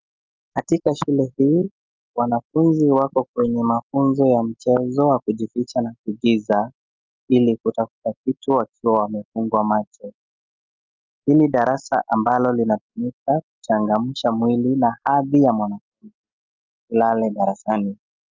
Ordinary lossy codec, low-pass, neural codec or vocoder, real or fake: Opus, 24 kbps; 7.2 kHz; none; real